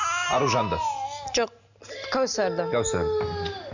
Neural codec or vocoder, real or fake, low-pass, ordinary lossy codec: none; real; 7.2 kHz; none